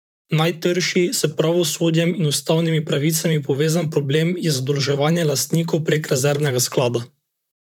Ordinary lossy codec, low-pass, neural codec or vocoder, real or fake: none; 19.8 kHz; vocoder, 44.1 kHz, 128 mel bands, Pupu-Vocoder; fake